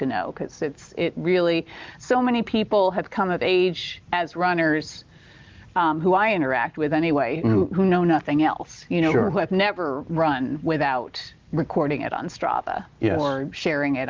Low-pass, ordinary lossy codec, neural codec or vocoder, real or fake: 7.2 kHz; Opus, 24 kbps; none; real